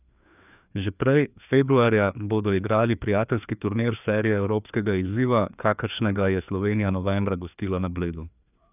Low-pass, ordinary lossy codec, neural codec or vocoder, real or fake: 3.6 kHz; none; codec, 16 kHz, 2 kbps, FreqCodec, larger model; fake